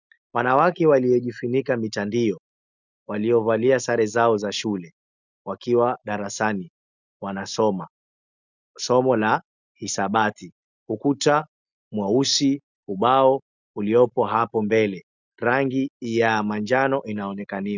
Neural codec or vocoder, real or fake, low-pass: none; real; 7.2 kHz